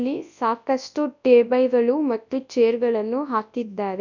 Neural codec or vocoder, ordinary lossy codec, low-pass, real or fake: codec, 24 kHz, 0.9 kbps, WavTokenizer, large speech release; none; 7.2 kHz; fake